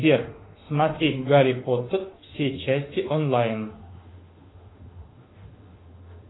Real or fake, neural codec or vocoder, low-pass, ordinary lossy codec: fake; autoencoder, 48 kHz, 32 numbers a frame, DAC-VAE, trained on Japanese speech; 7.2 kHz; AAC, 16 kbps